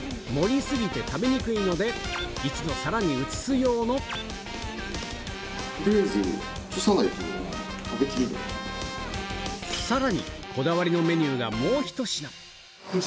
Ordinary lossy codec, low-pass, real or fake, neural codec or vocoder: none; none; real; none